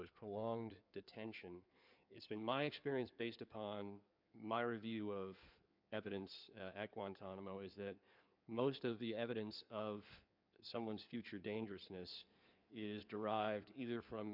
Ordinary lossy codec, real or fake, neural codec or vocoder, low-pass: MP3, 48 kbps; fake; codec, 16 kHz in and 24 kHz out, 2.2 kbps, FireRedTTS-2 codec; 5.4 kHz